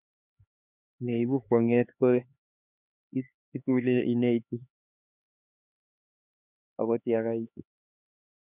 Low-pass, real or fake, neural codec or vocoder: 3.6 kHz; fake; codec, 16 kHz, 4 kbps, X-Codec, HuBERT features, trained on LibriSpeech